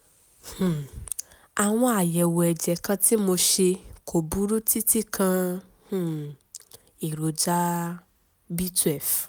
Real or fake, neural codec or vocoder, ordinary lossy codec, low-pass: real; none; none; none